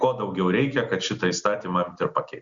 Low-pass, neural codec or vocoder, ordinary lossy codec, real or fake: 7.2 kHz; none; Opus, 64 kbps; real